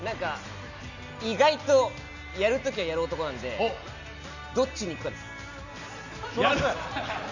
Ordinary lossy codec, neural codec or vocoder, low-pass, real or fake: none; none; 7.2 kHz; real